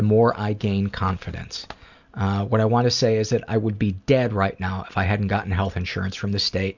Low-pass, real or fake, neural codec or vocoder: 7.2 kHz; real; none